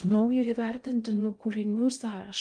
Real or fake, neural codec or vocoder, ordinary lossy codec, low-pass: fake; codec, 16 kHz in and 24 kHz out, 0.6 kbps, FocalCodec, streaming, 2048 codes; Opus, 32 kbps; 9.9 kHz